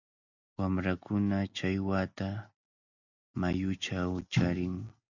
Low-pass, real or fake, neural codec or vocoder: 7.2 kHz; real; none